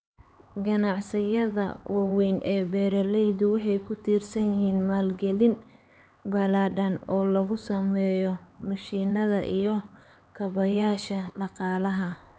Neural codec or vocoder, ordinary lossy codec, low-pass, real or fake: codec, 16 kHz, 4 kbps, X-Codec, HuBERT features, trained on LibriSpeech; none; none; fake